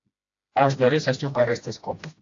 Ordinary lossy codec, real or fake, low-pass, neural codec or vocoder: AAC, 48 kbps; fake; 7.2 kHz; codec, 16 kHz, 1 kbps, FreqCodec, smaller model